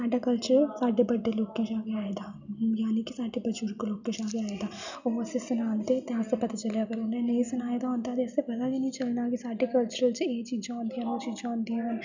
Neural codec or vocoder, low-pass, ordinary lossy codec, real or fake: none; 7.2 kHz; none; real